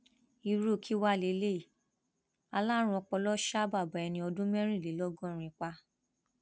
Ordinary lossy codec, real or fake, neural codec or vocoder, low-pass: none; real; none; none